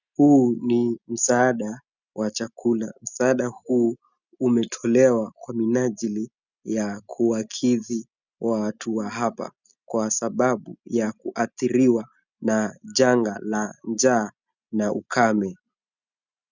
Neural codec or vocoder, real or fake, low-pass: none; real; 7.2 kHz